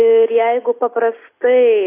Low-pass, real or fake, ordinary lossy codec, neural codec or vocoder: 3.6 kHz; real; AAC, 24 kbps; none